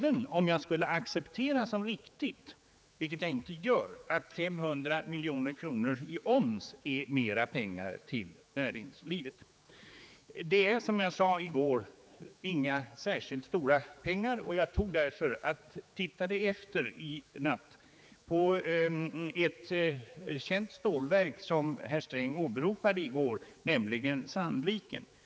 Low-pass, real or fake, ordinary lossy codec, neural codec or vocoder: none; fake; none; codec, 16 kHz, 4 kbps, X-Codec, HuBERT features, trained on general audio